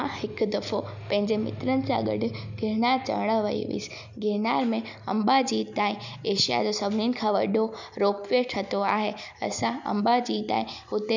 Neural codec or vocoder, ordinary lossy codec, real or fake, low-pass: none; none; real; 7.2 kHz